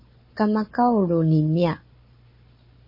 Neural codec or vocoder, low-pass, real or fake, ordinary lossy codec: codec, 16 kHz, 4 kbps, FunCodec, trained on Chinese and English, 50 frames a second; 5.4 kHz; fake; MP3, 24 kbps